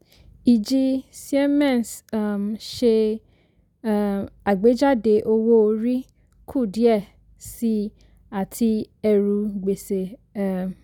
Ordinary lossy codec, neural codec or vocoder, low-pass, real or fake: none; none; 19.8 kHz; real